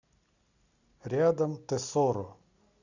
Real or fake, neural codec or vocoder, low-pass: real; none; 7.2 kHz